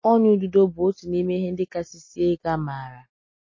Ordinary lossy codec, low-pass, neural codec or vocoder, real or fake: MP3, 32 kbps; 7.2 kHz; none; real